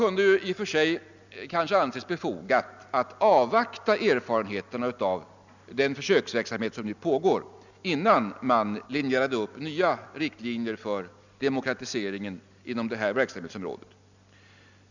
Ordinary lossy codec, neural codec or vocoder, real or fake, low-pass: none; none; real; 7.2 kHz